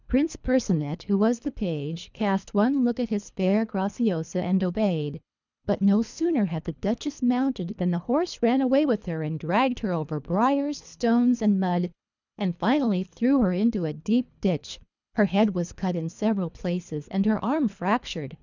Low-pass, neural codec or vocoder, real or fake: 7.2 kHz; codec, 24 kHz, 3 kbps, HILCodec; fake